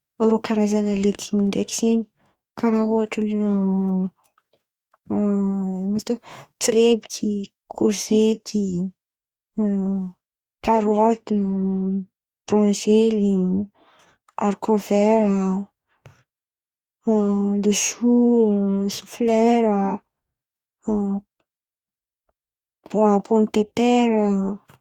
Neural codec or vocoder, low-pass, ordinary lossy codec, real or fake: codec, 44.1 kHz, 2.6 kbps, DAC; 19.8 kHz; Opus, 64 kbps; fake